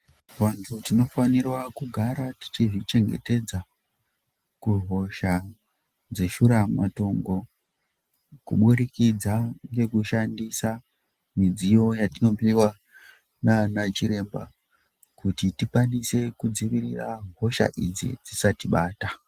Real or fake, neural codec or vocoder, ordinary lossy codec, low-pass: real; none; Opus, 24 kbps; 14.4 kHz